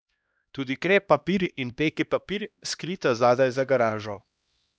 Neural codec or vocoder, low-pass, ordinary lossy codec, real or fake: codec, 16 kHz, 1 kbps, X-Codec, HuBERT features, trained on LibriSpeech; none; none; fake